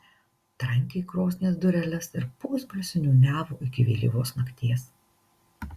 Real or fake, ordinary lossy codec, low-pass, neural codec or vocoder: real; Opus, 64 kbps; 14.4 kHz; none